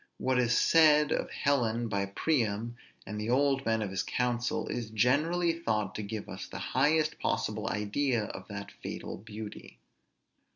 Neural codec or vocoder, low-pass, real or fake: none; 7.2 kHz; real